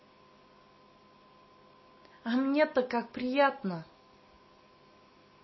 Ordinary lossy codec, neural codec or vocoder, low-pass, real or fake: MP3, 24 kbps; none; 7.2 kHz; real